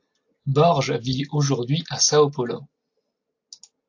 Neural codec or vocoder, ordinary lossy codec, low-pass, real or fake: none; AAC, 48 kbps; 7.2 kHz; real